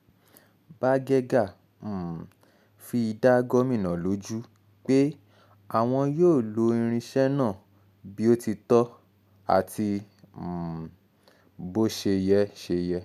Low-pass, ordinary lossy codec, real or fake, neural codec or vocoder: 14.4 kHz; none; real; none